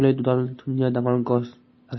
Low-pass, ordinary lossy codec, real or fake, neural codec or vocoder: 7.2 kHz; MP3, 24 kbps; real; none